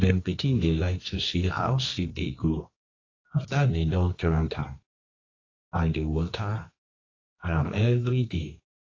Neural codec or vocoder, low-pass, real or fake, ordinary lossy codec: codec, 24 kHz, 0.9 kbps, WavTokenizer, medium music audio release; 7.2 kHz; fake; AAC, 32 kbps